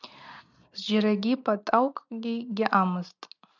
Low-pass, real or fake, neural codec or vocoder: 7.2 kHz; real; none